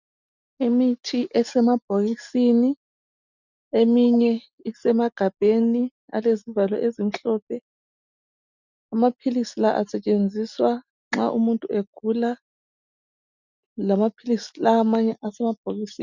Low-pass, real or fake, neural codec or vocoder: 7.2 kHz; real; none